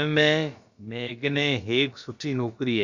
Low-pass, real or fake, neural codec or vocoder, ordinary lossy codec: 7.2 kHz; fake; codec, 16 kHz, about 1 kbps, DyCAST, with the encoder's durations; Opus, 64 kbps